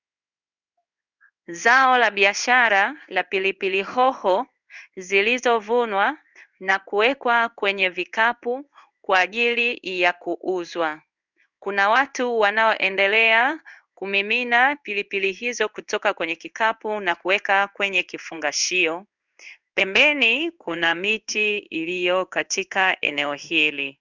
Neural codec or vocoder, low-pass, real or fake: codec, 16 kHz in and 24 kHz out, 1 kbps, XY-Tokenizer; 7.2 kHz; fake